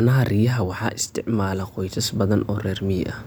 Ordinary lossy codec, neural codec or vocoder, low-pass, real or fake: none; none; none; real